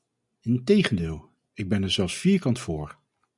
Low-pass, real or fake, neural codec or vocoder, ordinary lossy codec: 10.8 kHz; real; none; MP3, 96 kbps